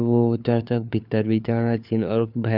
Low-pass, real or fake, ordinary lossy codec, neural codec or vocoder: 5.4 kHz; fake; none; codec, 16 kHz, 4 kbps, FunCodec, trained on LibriTTS, 50 frames a second